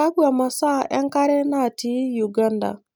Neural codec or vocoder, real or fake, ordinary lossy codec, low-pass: none; real; none; none